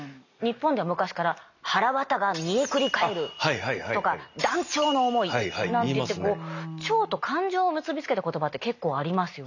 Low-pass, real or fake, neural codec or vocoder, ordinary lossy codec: 7.2 kHz; real; none; none